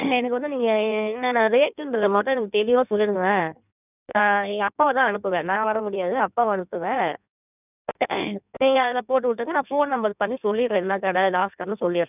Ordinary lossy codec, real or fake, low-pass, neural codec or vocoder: none; fake; 3.6 kHz; codec, 16 kHz in and 24 kHz out, 1.1 kbps, FireRedTTS-2 codec